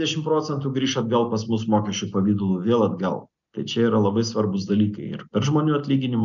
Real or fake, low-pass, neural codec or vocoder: real; 7.2 kHz; none